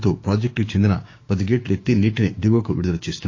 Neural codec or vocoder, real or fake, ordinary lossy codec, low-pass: codec, 16 kHz, 6 kbps, DAC; fake; AAC, 32 kbps; 7.2 kHz